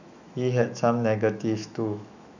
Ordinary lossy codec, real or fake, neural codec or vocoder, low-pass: none; fake; autoencoder, 48 kHz, 128 numbers a frame, DAC-VAE, trained on Japanese speech; 7.2 kHz